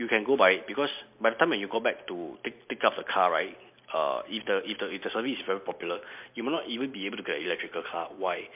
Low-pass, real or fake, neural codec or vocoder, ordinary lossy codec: 3.6 kHz; real; none; MP3, 32 kbps